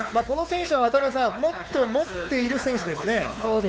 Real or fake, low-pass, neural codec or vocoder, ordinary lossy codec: fake; none; codec, 16 kHz, 4 kbps, X-Codec, WavLM features, trained on Multilingual LibriSpeech; none